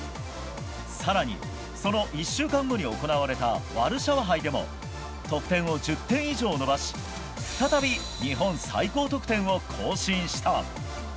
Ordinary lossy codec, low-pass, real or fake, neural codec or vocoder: none; none; real; none